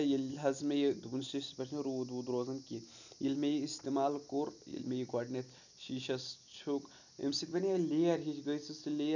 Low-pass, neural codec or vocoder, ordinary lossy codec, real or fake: 7.2 kHz; none; none; real